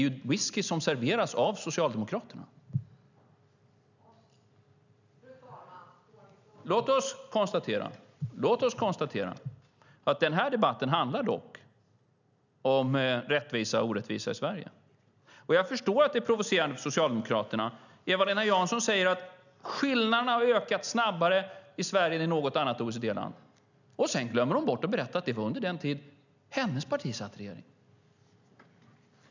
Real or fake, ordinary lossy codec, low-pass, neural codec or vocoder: real; none; 7.2 kHz; none